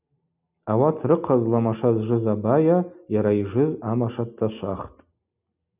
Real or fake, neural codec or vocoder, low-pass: real; none; 3.6 kHz